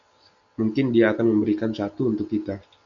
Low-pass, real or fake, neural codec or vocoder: 7.2 kHz; real; none